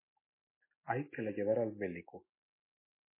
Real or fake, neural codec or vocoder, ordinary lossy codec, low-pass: real; none; MP3, 16 kbps; 3.6 kHz